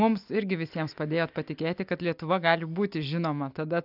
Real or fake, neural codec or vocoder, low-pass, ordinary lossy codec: real; none; 5.4 kHz; AAC, 48 kbps